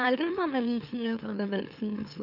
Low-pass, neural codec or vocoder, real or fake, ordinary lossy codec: 5.4 kHz; autoencoder, 44.1 kHz, a latent of 192 numbers a frame, MeloTTS; fake; none